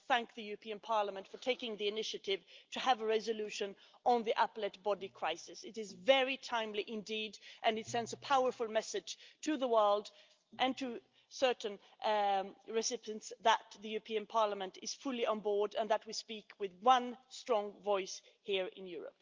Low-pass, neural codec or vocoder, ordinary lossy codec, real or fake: 7.2 kHz; none; Opus, 24 kbps; real